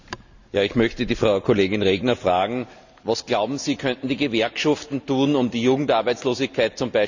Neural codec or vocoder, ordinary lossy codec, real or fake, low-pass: none; none; real; 7.2 kHz